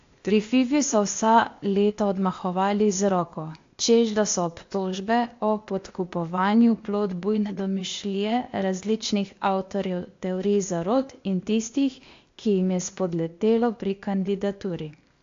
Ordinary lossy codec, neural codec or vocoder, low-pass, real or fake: AAC, 48 kbps; codec, 16 kHz, 0.8 kbps, ZipCodec; 7.2 kHz; fake